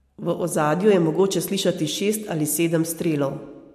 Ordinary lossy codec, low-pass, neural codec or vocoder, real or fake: MP3, 64 kbps; 14.4 kHz; none; real